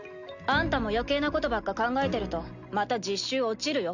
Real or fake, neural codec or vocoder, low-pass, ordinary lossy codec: real; none; 7.2 kHz; none